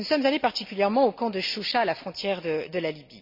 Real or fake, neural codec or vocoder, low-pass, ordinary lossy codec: real; none; 5.4 kHz; MP3, 48 kbps